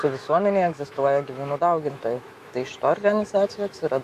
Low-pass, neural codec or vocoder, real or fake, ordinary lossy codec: 14.4 kHz; autoencoder, 48 kHz, 128 numbers a frame, DAC-VAE, trained on Japanese speech; fake; Opus, 64 kbps